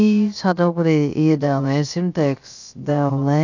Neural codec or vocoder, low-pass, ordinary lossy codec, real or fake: codec, 16 kHz, about 1 kbps, DyCAST, with the encoder's durations; 7.2 kHz; none; fake